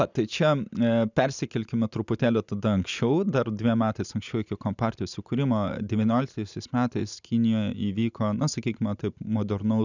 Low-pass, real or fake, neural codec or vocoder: 7.2 kHz; real; none